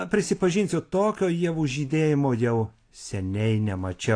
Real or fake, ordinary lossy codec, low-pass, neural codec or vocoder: real; AAC, 48 kbps; 9.9 kHz; none